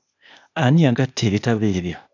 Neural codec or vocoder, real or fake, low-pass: codec, 16 kHz, 0.8 kbps, ZipCodec; fake; 7.2 kHz